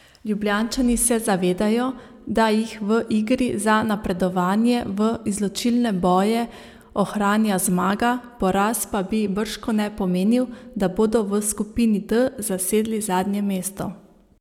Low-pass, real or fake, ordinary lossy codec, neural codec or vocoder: 19.8 kHz; real; none; none